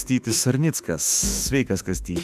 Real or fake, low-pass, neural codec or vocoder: fake; 14.4 kHz; autoencoder, 48 kHz, 32 numbers a frame, DAC-VAE, trained on Japanese speech